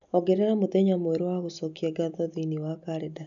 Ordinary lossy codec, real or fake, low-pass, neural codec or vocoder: none; real; 7.2 kHz; none